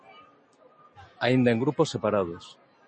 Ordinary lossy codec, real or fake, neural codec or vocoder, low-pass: MP3, 32 kbps; real; none; 9.9 kHz